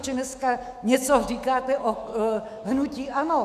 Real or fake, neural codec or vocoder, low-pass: fake; autoencoder, 48 kHz, 128 numbers a frame, DAC-VAE, trained on Japanese speech; 14.4 kHz